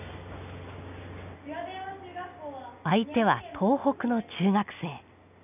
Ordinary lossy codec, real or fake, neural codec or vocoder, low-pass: none; real; none; 3.6 kHz